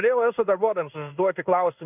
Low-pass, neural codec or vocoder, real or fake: 3.6 kHz; codec, 16 kHz in and 24 kHz out, 1 kbps, XY-Tokenizer; fake